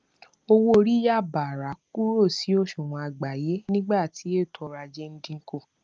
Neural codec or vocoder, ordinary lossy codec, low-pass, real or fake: none; Opus, 24 kbps; 7.2 kHz; real